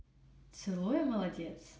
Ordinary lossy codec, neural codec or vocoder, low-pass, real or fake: none; none; none; real